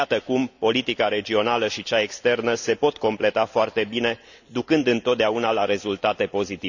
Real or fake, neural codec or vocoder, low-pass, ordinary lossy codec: real; none; 7.2 kHz; none